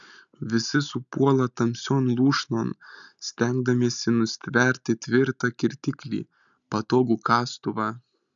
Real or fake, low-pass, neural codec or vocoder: real; 7.2 kHz; none